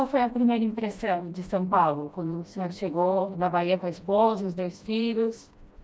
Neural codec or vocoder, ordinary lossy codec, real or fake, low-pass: codec, 16 kHz, 1 kbps, FreqCodec, smaller model; none; fake; none